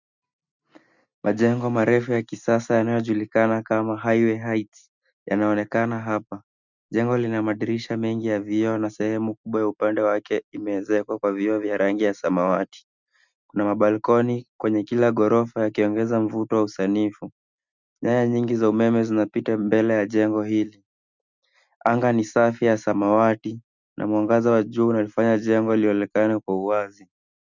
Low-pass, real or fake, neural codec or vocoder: 7.2 kHz; real; none